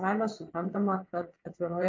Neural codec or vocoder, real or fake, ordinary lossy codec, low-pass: vocoder, 22.05 kHz, 80 mel bands, WaveNeXt; fake; MP3, 48 kbps; 7.2 kHz